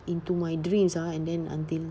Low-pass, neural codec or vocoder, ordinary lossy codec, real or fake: none; none; none; real